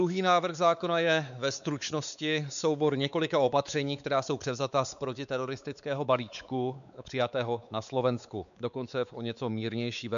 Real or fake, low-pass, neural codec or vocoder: fake; 7.2 kHz; codec, 16 kHz, 4 kbps, X-Codec, WavLM features, trained on Multilingual LibriSpeech